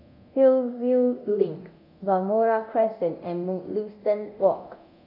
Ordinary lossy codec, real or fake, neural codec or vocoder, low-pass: none; fake; codec, 24 kHz, 0.9 kbps, DualCodec; 5.4 kHz